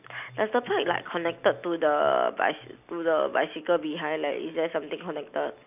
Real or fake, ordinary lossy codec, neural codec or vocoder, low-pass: real; none; none; 3.6 kHz